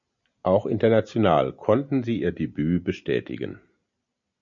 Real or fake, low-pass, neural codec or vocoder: real; 7.2 kHz; none